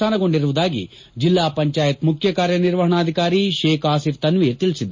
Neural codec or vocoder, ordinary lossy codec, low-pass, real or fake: none; MP3, 32 kbps; 7.2 kHz; real